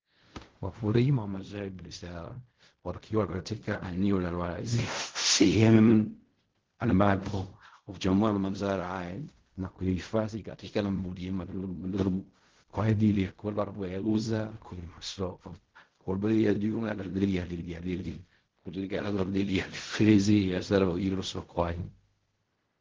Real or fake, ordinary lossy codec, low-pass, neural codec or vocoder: fake; Opus, 16 kbps; 7.2 kHz; codec, 16 kHz in and 24 kHz out, 0.4 kbps, LongCat-Audio-Codec, fine tuned four codebook decoder